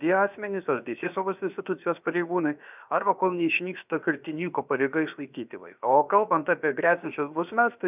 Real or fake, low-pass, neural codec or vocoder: fake; 3.6 kHz; codec, 16 kHz, about 1 kbps, DyCAST, with the encoder's durations